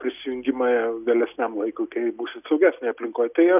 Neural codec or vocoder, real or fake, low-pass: none; real; 3.6 kHz